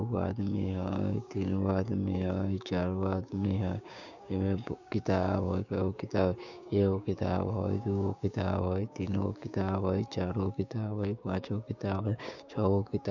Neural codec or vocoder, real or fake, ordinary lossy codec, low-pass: codec, 16 kHz, 6 kbps, DAC; fake; none; 7.2 kHz